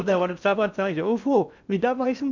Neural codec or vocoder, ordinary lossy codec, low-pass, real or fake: codec, 16 kHz in and 24 kHz out, 0.8 kbps, FocalCodec, streaming, 65536 codes; none; 7.2 kHz; fake